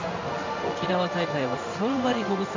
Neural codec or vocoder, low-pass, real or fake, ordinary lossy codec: codec, 16 kHz in and 24 kHz out, 1 kbps, XY-Tokenizer; 7.2 kHz; fake; MP3, 64 kbps